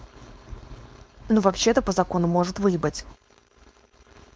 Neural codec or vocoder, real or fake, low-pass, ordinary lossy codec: codec, 16 kHz, 4.8 kbps, FACodec; fake; none; none